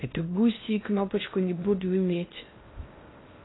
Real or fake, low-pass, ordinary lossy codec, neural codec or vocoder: fake; 7.2 kHz; AAC, 16 kbps; codec, 16 kHz in and 24 kHz out, 0.6 kbps, FocalCodec, streaming, 2048 codes